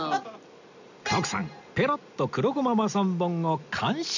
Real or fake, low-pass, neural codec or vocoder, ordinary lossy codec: real; 7.2 kHz; none; none